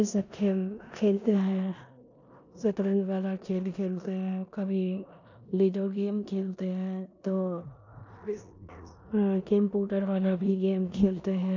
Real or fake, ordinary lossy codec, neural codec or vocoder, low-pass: fake; none; codec, 16 kHz in and 24 kHz out, 0.9 kbps, LongCat-Audio-Codec, four codebook decoder; 7.2 kHz